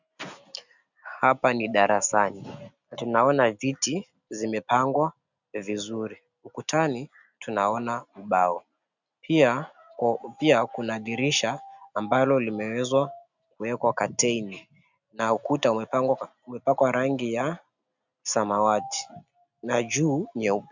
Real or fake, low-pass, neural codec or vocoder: real; 7.2 kHz; none